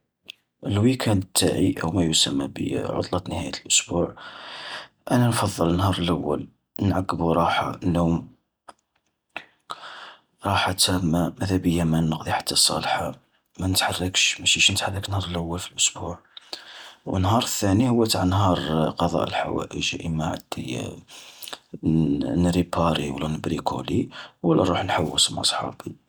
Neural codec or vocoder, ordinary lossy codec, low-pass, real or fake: none; none; none; real